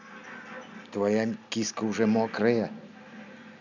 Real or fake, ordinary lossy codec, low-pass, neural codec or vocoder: real; none; 7.2 kHz; none